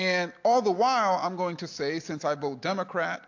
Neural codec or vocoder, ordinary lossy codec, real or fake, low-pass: none; AAC, 48 kbps; real; 7.2 kHz